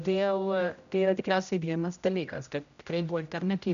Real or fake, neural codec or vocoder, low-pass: fake; codec, 16 kHz, 0.5 kbps, X-Codec, HuBERT features, trained on general audio; 7.2 kHz